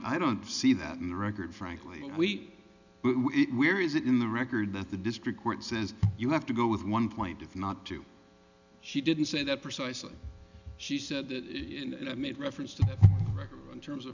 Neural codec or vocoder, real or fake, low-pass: none; real; 7.2 kHz